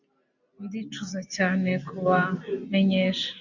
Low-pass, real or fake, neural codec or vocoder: 7.2 kHz; real; none